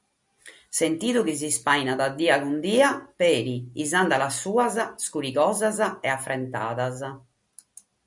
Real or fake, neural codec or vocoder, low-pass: real; none; 10.8 kHz